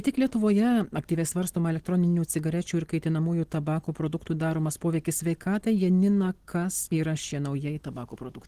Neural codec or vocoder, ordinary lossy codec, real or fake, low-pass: none; Opus, 16 kbps; real; 14.4 kHz